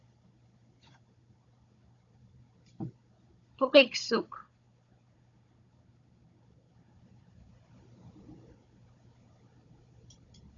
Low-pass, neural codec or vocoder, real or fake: 7.2 kHz; codec, 16 kHz, 16 kbps, FunCodec, trained on Chinese and English, 50 frames a second; fake